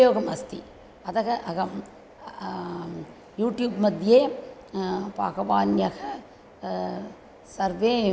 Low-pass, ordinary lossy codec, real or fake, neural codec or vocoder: none; none; real; none